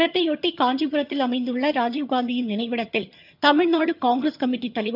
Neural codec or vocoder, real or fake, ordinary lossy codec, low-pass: vocoder, 22.05 kHz, 80 mel bands, HiFi-GAN; fake; none; 5.4 kHz